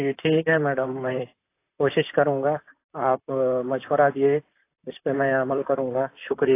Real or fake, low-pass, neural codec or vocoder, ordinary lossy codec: fake; 3.6 kHz; vocoder, 44.1 kHz, 128 mel bands, Pupu-Vocoder; AAC, 24 kbps